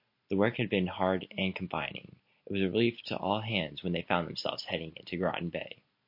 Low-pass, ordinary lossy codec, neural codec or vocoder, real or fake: 5.4 kHz; MP3, 32 kbps; none; real